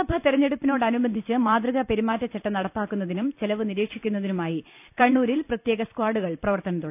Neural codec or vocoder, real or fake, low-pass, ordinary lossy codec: vocoder, 44.1 kHz, 128 mel bands every 256 samples, BigVGAN v2; fake; 3.6 kHz; none